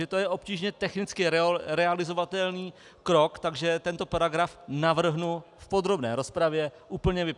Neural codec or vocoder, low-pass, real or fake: none; 10.8 kHz; real